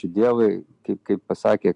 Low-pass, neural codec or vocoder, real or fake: 10.8 kHz; none; real